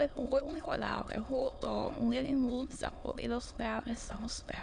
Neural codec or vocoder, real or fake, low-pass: autoencoder, 22.05 kHz, a latent of 192 numbers a frame, VITS, trained on many speakers; fake; 9.9 kHz